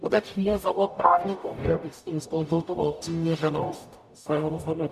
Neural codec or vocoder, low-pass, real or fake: codec, 44.1 kHz, 0.9 kbps, DAC; 14.4 kHz; fake